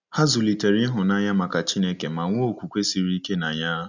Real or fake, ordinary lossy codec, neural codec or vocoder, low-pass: real; none; none; 7.2 kHz